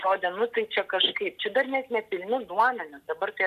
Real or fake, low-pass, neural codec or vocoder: real; 14.4 kHz; none